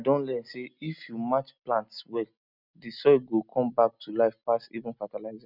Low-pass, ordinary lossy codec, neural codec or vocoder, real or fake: 5.4 kHz; none; none; real